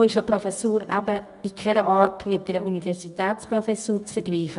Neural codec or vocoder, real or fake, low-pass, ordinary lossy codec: codec, 24 kHz, 0.9 kbps, WavTokenizer, medium music audio release; fake; 10.8 kHz; MP3, 96 kbps